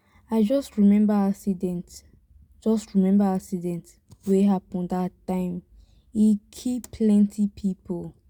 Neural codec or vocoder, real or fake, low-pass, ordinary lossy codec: none; real; 19.8 kHz; none